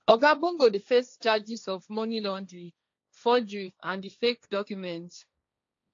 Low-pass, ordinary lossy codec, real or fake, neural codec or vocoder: 7.2 kHz; AAC, 48 kbps; fake; codec, 16 kHz, 1.1 kbps, Voila-Tokenizer